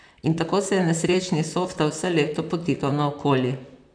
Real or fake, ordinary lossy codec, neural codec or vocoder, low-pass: fake; none; vocoder, 44.1 kHz, 128 mel bands, Pupu-Vocoder; 9.9 kHz